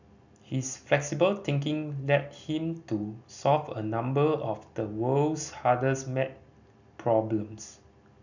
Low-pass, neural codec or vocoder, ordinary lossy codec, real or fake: 7.2 kHz; none; none; real